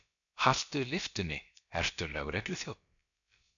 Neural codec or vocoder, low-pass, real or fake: codec, 16 kHz, about 1 kbps, DyCAST, with the encoder's durations; 7.2 kHz; fake